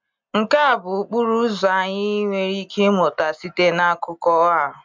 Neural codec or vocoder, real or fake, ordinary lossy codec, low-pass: none; real; MP3, 64 kbps; 7.2 kHz